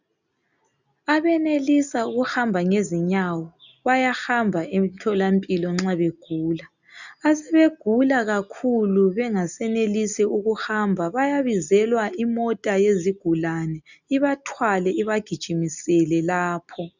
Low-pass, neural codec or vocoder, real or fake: 7.2 kHz; none; real